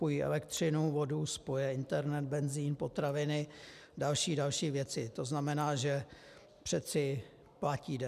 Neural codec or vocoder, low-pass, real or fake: none; 14.4 kHz; real